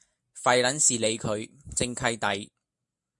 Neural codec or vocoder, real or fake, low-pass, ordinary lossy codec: none; real; 10.8 kHz; MP3, 64 kbps